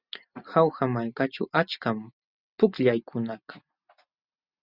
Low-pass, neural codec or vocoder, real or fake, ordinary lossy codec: 5.4 kHz; none; real; Opus, 64 kbps